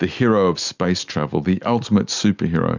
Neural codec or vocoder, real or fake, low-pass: none; real; 7.2 kHz